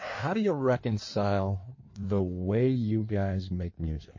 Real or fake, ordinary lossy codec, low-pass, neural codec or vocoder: fake; MP3, 32 kbps; 7.2 kHz; codec, 16 kHz in and 24 kHz out, 1.1 kbps, FireRedTTS-2 codec